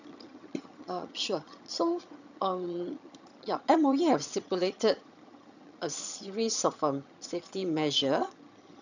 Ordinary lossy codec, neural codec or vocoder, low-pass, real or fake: none; vocoder, 22.05 kHz, 80 mel bands, HiFi-GAN; 7.2 kHz; fake